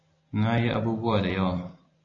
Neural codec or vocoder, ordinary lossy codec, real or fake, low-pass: none; AAC, 32 kbps; real; 7.2 kHz